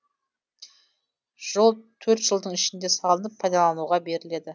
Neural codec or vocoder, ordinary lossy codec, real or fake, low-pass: none; none; real; none